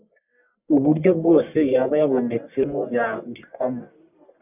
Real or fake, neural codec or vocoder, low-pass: fake; codec, 44.1 kHz, 1.7 kbps, Pupu-Codec; 3.6 kHz